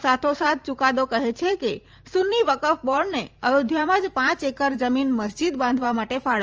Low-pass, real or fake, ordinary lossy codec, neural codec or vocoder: 7.2 kHz; fake; Opus, 24 kbps; vocoder, 44.1 kHz, 128 mel bands every 512 samples, BigVGAN v2